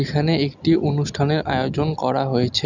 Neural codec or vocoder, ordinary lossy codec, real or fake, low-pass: none; none; real; 7.2 kHz